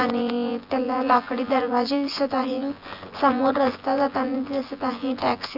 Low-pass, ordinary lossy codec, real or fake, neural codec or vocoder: 5.4 kHz; none; fake; vocoder, 24 kHz, 100 mel bands, Vocos